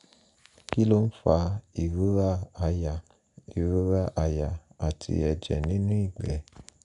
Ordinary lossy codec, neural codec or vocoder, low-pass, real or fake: none; none; 10.8 kHz; real